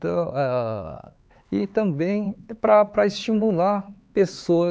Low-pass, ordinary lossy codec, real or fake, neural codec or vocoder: none; none; fake; codec, 16 kHz, 4 kbps, X-Codec, HuBERT features, trained on LibriSpeech